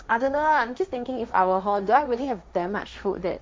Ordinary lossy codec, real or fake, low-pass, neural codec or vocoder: none; fake; none; codec, 16 kHz, 1.1 kbps, Voila-Tokenizer